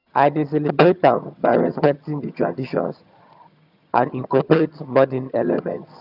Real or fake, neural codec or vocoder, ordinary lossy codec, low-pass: fake; vocoder, 22.05 kHz, 80 mel bands, HiFi-GAN; none; 5.4 kHz